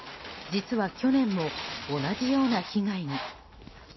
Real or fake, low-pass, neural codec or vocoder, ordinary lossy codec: real; 7.2 kHz; none; MP3, 24 kbps